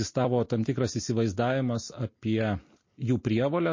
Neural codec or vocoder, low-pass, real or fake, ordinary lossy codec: none; 7.2 kHz; real; MP3, 32 kbps